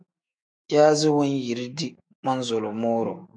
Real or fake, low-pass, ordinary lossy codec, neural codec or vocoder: fake; 9.9 kHz; AAC, 64 kbps; autoencoder, 48 kHz, 128 numbers a frame, DAC-VAE, trained on Japanese speech